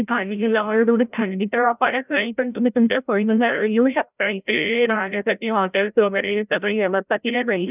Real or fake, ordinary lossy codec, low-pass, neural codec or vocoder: fake; none; 3.6 kHz; codec, 16 kHz, 0.5 kbps, FreqCodec, larger model